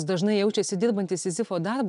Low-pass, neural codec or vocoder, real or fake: 10.8 kHz; none; real